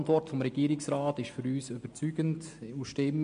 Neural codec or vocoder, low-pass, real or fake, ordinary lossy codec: none; 9.9 kHz; real; MP3, 48 kbps